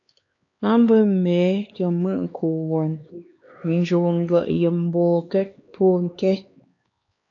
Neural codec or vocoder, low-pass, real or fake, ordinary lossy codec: codec, 16 kHz, 2 kbps, X-Codec, HuBERT features, trained on LibriSpeech; 7.2 kHz; fake; AAC, 48 kbps